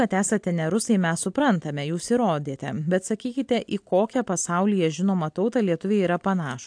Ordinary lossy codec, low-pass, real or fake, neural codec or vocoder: AAC, 64 kbps; 9.9 kHz; real; none